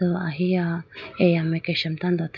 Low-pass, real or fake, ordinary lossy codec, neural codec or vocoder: 7.2 kHz; real; none; none